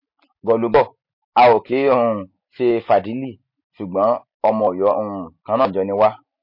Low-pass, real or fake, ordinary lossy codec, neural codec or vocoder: 5.4 kHz; real; MP3, 32 kbps; none